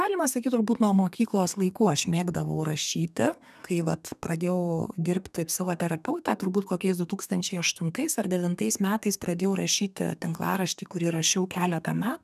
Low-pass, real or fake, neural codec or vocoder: 14.4 kHz; fake; codec, 32 kHz, 1.9 kbps, SNAC